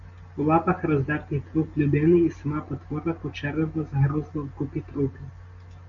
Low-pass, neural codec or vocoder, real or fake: 7.2 kHz; none; real